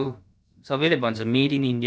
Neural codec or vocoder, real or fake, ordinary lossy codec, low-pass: codec, 16 kHz, about 1 kbps, DyCAST, with the encoder's durations; fake; none; none